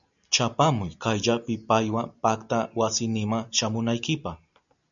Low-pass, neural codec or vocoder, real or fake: 7.2 kHz; none; real